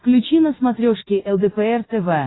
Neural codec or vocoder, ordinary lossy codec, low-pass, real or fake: none; AAC, 16 kbps; 7.2 kHz; real